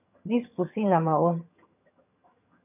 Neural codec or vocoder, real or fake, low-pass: vocoder, 22.05 kHz, 80 mel bands, HiFi-GAN; fake; 3.6 kHz